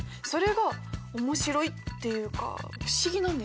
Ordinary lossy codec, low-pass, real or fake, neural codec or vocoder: none; none; real; none